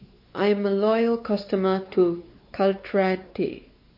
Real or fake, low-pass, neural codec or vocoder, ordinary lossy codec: fake; 5.4 kHz; codec, 16 kHz, 4 kbps, X-Codec, WavLM features, trained on Multilingual LibriSpeech; MP3, 32 kbps